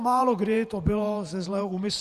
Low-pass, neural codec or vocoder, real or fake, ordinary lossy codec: 14.4 kHz; vocoder, 48 kHz, 128 mel bands, Vocos; fake; Opus, 64 kbps